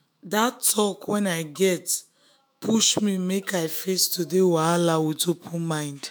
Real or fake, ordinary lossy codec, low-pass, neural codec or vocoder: fake; none; none; autoencoder, 48 kHz, 128 numbers a frame, DAC-VAE, trained on Japanese speech